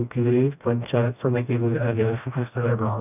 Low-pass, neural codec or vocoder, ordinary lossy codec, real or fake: 3.6 kHz; codec, 16 kHz, 1 kbps, FreqCodec, smaller model; none; fake